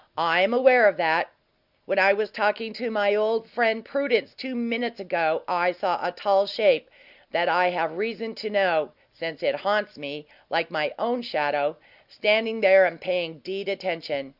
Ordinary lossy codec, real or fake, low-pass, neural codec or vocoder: Opus, 64 kbps; real; 5.4 kHz; none